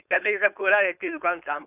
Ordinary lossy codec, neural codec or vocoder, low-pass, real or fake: none; codec, 16 kHz, 4.8 kbps, FACodec; 3.6 kHz; fake